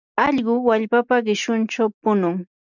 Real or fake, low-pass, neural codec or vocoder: real; 7.2 kHz; none